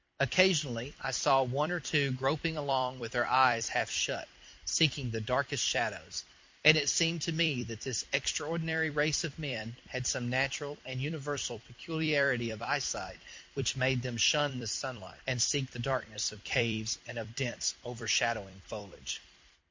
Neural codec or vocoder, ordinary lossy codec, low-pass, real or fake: vocoder, 44.1 kHz, 128 mel bands every 256 samples, BigVGAN v2; MP3, 48 kbps; 7.2 kHz; fake